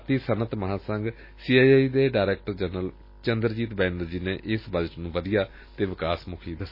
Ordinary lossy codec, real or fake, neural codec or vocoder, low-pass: MP3, 24 kbps; real; none; 5.4 kHz